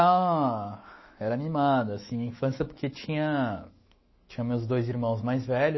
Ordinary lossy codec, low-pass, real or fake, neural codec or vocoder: MP3, 24 kbps; 7.2 kHz; fake; autoencoder, 48 kHz, 128 numbers a frame, DAC-VAE, trained on Japanese speech